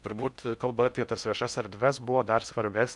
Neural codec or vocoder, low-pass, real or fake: codec, 16 kHz in and 24 kHz out, 0.6 kbps, FocalCodec, streaming, 4096 codes; 10.8 kHz; fake